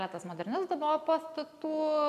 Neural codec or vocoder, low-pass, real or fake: none; 14.4 kHz; real